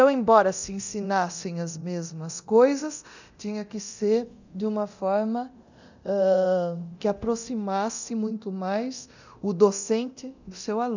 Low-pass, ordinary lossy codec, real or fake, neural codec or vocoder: 7.2 kHz; none; fake; codec, 24 kHz, 0.9 kbps, DualCodec